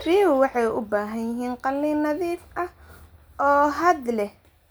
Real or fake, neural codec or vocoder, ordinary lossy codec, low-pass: real; none; none; none